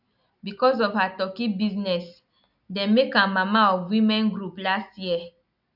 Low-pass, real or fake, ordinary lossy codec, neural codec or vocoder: 5.4 kHz; real; none; none